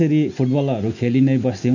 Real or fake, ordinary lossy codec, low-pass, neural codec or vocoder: real; none; 7.2 kHz; none